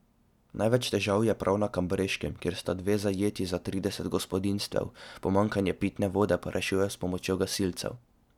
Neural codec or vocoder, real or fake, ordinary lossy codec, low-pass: none; real; none; 19.8 kHz